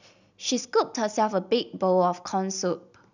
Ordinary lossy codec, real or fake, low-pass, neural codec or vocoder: none; real; 7.2 kHz; none